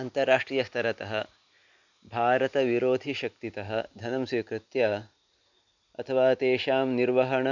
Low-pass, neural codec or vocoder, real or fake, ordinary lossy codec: 7.2 kHz; none; real; none